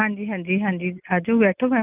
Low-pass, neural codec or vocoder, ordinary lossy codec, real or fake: 3.6 kHz; none; Opus, 24 kbps; real